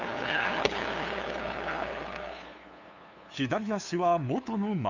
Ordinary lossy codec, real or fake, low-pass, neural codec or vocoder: none; fake; 7.2 kHz; codec, 16 kHz, 2 kbps, FunCodec, trained on LibriTTS, 25 frames a second